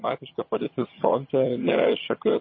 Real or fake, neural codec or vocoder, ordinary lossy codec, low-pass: fake; vocoder, 22.05 kHz, 80 mel bands, HiFi-GAN; MP3, 24 kbps; 7.2 kHz